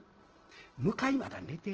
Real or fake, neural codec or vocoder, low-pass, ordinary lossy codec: real; none; 7.2 kHz; Opus, 16 kbps